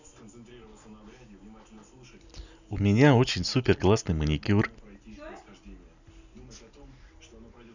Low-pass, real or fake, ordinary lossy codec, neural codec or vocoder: 7.2 kHz; real; none; none